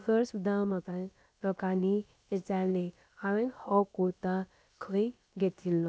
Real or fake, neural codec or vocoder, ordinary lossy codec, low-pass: fake; codec, 16 kHz, about 1 kbps, DyCAST, with the encoder's durations; none; none